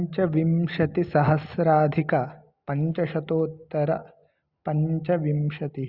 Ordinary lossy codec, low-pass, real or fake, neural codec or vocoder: Opus, 64 kbps; 5.4 kHz; real; none